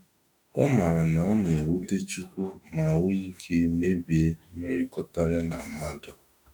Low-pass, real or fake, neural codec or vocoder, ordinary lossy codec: 19.8 kHz; fake; autoencoder, 48 kHz, 32 numbers a frame, DAC-VAE, trained on Japanese speech; none